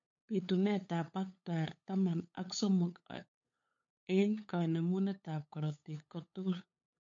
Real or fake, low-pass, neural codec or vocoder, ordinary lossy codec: fake; 7.2 kHz; codec, 16 kHz, 8 kbps, FunCodec, trained on LibriTTS, 25 frames a second; MP3, 48 kbps